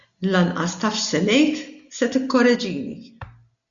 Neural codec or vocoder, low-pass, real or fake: none; 7.2 kHz; real